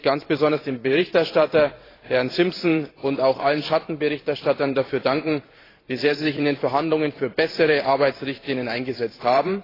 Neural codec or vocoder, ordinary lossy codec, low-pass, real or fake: vocoder, 44.1 kHz, 128 mel bands every 512 samples, BigVGAN v2; AAC, 24 kbps; 5.4 kHz; fake